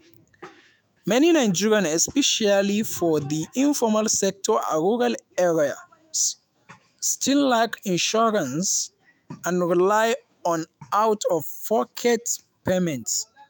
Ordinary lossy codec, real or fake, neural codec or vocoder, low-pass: none; fake; autoencoder, 48 kHz, 128 numbers a frame, DAC-VAE, trained on Japanese speech; none